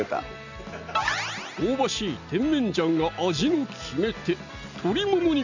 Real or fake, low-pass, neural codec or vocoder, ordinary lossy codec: real; 7.2 kHz; none; none